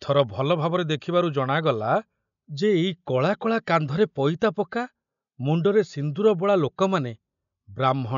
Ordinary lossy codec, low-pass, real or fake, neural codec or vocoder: none; 7.2 kHz; real; none